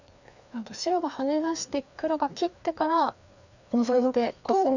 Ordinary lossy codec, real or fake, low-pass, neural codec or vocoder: none; fake; 7.2 kHz; codec, 16 kHz, 2 kbps, FreqCodec, larger model